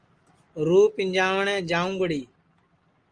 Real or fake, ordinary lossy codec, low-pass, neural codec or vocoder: real; Opus, 24 kbps; 9.9 kHz; none